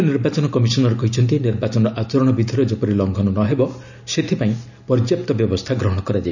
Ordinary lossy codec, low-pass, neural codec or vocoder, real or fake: none; 7.2 kHz; none; real